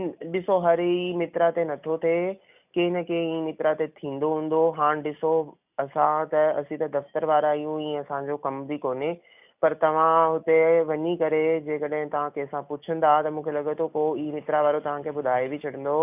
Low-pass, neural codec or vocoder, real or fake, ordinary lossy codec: 3.6 kHz; none; real; none